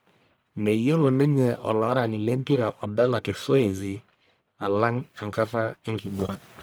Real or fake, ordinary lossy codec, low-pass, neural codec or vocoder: fake; none; none; codec, 44.1 kHz, 1.7 kbps, Pupu-Codec